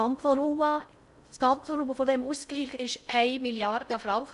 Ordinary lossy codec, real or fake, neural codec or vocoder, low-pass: MP3, 96 kbps; fake; codec, 16 kHz in and 24 kHz out, 0.6 kbps, FocalCodec, streaming, 2048 codes; 10.8 kHz